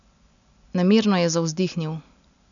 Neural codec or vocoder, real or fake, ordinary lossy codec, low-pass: none; real; none; 7.2 kHz